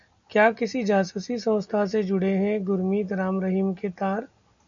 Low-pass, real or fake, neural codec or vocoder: 7.2 kHz; real; none